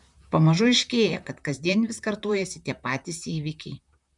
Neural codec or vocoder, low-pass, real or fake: vocoder, 44.1 kHz, 128 mel bands, Pupu-Vocoder; 10.8 kHz; fake